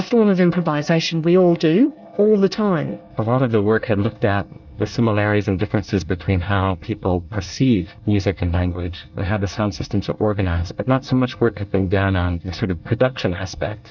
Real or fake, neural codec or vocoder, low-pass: fake; codec, 24 kHz, 1 kbps, SNAC; 7.2 kHz